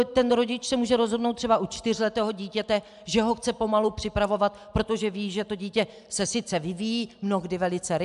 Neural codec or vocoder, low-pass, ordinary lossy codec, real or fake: none; 10.8 kHz; AAC, 96 kbps; real